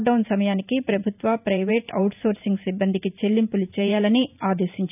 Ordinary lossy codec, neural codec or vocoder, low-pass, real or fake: none; vocoder, 44.1 kHz, 128 mel bands every 512 samples, BigVGAN v2; 3.6 kHz; fake